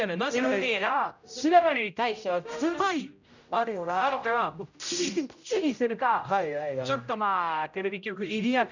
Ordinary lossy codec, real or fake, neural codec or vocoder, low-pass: none; fake; codec, 16 kHz, 0.5 kbps, X-Codec, HuBERT features, trained on general audio; 7.2 kHz